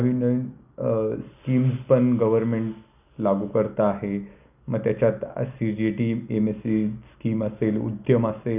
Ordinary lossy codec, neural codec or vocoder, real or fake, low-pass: none; none; real; 3.6 kHz